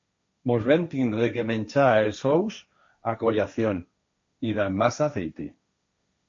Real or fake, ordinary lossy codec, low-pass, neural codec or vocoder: fake; MP3, 48 kbps; 7.2 kHz; codec, 16 kHz, 1.1 kbps, Voila-Tokenizer